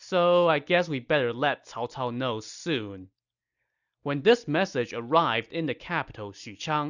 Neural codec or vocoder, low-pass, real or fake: none; 7.2 kHz; real